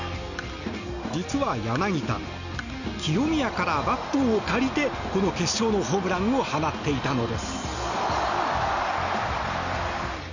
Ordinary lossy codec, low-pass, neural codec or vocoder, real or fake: none; 7.2 kHz; none; real